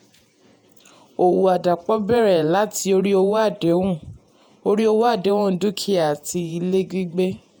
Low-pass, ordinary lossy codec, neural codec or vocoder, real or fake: none; none; vocoder, 48 kHz, 128 mel bands, Vocos; fake